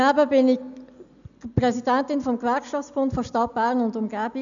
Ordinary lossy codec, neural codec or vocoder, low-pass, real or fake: none; none; 7.2 kHz; real